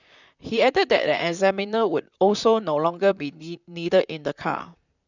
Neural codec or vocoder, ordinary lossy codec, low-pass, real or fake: vocoder, 44.1 kHz, 128 mel bands, Pupu-Vocoder; none; 7.2 kHz; fake